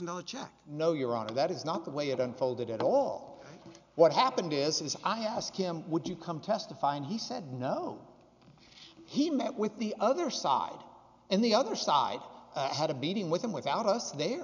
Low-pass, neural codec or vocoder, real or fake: 7.2 kHz; none; real